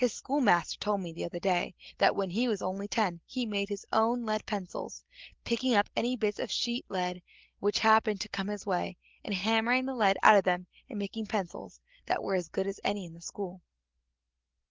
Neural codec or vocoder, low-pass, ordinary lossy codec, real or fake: none; 7.2 kHz; Opus, 16 kbps; real